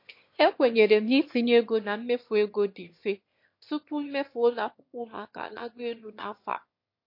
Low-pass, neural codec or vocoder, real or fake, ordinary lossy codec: 5.4 kHz; autoencoder, 22.05 kHz, a latent of 192 numbers a frame, VITS, trained on one speaker; fake; MP3, 32 kbps